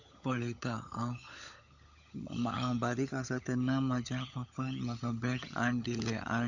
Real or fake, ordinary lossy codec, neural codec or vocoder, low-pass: fake; none; codec, 16 kHz, 16 kbps, FunCodec, trained on LibriTTS, 50 frames a second; 7.2 kHz